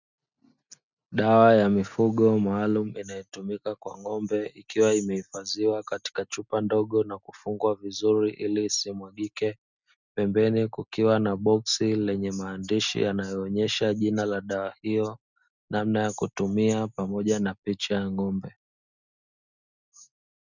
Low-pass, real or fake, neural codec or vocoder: 7.2 kHz; real; none